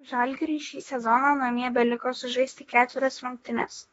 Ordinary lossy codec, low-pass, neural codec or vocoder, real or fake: AAC, 24 kbps; 19.8 kHz; autoencoder, 48 kHz, 32 numbers a frame, DAC-VAE, trained on Japanese speech; fake